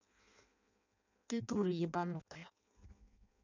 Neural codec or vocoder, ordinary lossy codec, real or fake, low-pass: codec, 16 kHz in and 24 kHz out, 0.6 kbps, FireRedTTS-2 codec; none; fake; 7.2 kHz